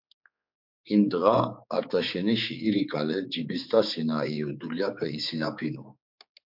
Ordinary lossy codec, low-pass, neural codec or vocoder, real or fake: MP3, 48 kbps; 5.4 kHz; codec, 16 kHz, 4 kbps, X-Codec, HuBERT features, trained on general audio; fake